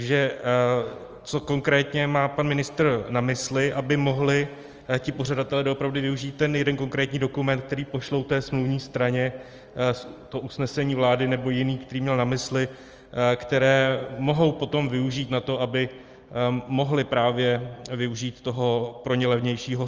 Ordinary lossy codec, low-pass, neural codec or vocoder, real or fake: Opus, 24 kbps; 7.2 kHz; none; real